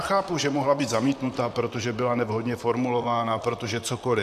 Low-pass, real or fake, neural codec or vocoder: 14.4 kHz; fake; vocoder, 44.1 kHz, 128 mel bands, Pupu-Vocoder